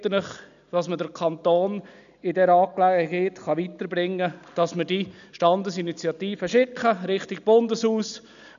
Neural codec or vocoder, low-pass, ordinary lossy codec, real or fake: none; 7.2 kHz; none; real